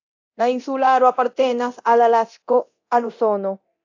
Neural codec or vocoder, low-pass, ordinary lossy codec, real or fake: codec, 24 kHz, 0.9 kbps, DualCodec; 7.2 kHz; AAC, 48 kbps; fake